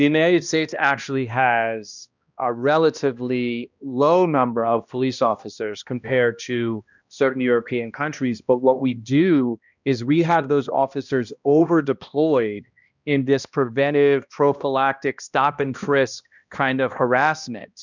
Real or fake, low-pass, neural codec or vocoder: fake; 7.2 kHz; codec, 16 kHz, 1 kbps, X-Codec, HuBERT features, trained on balanced general audio